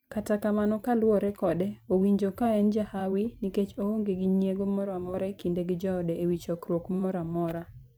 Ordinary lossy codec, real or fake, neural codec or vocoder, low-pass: none; fake; vocoder, 44.1 kHz, 128 mel bands every 512 samples, BigVGAN v2; none